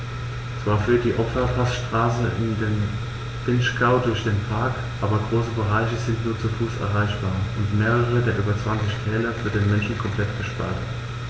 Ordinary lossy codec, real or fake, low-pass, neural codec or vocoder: none; real; none; none